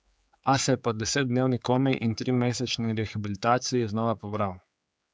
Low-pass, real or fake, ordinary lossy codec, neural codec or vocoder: none; fake; none; codec, 16 kHz, 4 kbps, X-Codec, HuBERT features, trained on general audio